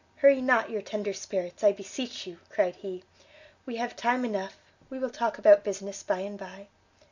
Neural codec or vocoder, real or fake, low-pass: none; real; 7.2 kHz